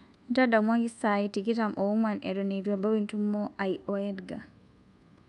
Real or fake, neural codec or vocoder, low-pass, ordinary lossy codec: fake; codec, 24 kHz, 1.2 kbps, DualCodec; 10.8 kHz; none